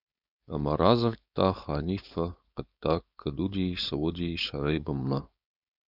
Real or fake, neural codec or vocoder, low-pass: fake; codec, 16 kHz, 4.8 kbps, FACodec; 5.4 kHz